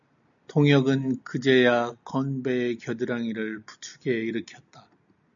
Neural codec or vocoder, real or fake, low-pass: none; real; 7.2 kHz